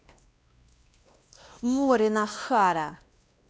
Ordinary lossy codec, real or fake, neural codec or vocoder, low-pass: none; fake; codec, 16 kHz, 1 kbps, X-Codec, WavLM features, trained on Multilingual LibriSpeech; none